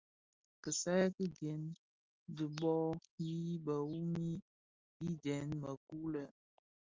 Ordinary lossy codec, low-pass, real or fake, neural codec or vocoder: Opus, 32 kbps; 7.2 kHz; real; none